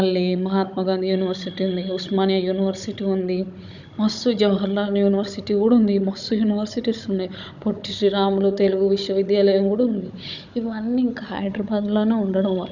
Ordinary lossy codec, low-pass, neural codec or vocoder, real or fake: none; 7.2 kHz; codec, 16 kHz, 16 kbps, FunCodec, trained on Chinese and English, 50 frames a second; fake